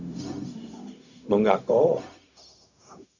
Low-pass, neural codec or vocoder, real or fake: 7.2 kHz; codec, 16 kHz, 0.4 kbps, LongCat-Audio-Codec; fake